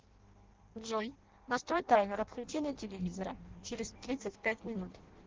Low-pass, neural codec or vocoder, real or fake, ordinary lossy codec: 7.2 kHz; codec, 16 kHz in and 24 kHz out, 0.6 kbps, FireRedTTS-2 codec; fake; Opus, 16 kbps